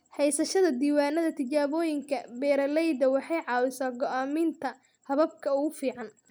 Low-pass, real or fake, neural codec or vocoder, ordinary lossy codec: none; real; none; none